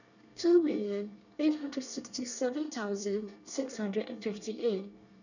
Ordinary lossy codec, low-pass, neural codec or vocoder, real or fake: none; 7.2 kHz; codec, 24 kHz, 1 kbps, SNAC; fake